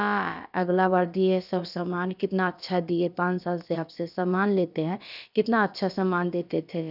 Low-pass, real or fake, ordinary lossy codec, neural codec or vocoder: 5.4 kHz; fake; none; codec, 16 kHz, about 1 kbps, DyCAST, with the encoder's durations